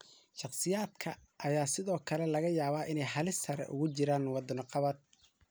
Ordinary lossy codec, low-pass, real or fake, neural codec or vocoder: none; none; real; none